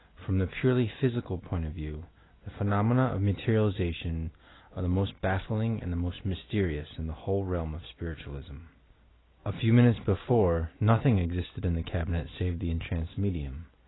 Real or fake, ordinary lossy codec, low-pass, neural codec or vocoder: real; AAC, 16 kbps; 7.2 kHz; none